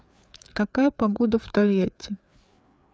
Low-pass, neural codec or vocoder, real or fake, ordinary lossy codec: none; codec, 16 kHz, 4 kbps, FreqCodec, larger model; fake; none